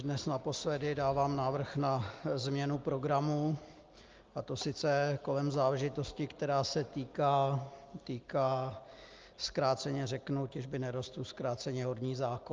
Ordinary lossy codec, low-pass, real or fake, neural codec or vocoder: Opus, 24 kbps; 7.2 kHz; real; none